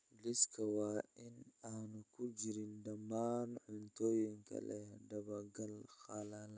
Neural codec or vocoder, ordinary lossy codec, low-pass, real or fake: none; none; none; real